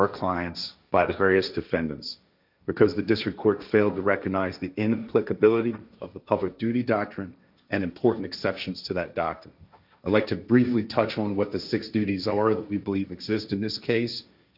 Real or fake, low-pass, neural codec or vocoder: fake; 5.4 kHz; codec, 16 kHz, 1.1 kbps, Voila-Tokenizer